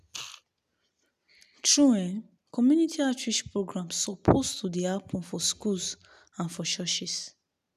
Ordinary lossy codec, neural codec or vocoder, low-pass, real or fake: none; none; 14.4 kHz; real